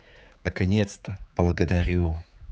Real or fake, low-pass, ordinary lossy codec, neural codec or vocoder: fake; none; none; codec, 16 kHz, 4 kbps, X-Codec, HuBERT features, trained on general audio